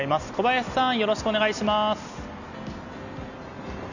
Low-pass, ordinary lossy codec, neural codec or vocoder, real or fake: 7.2 kHz; none; none; real